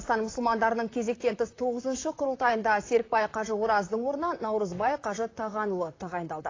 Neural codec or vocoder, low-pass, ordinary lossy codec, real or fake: vocoder, 44.1 kHz, 128 mel bands, Pupu-Vocoder; 7.2 kHz; AAC, 32 kbps; fake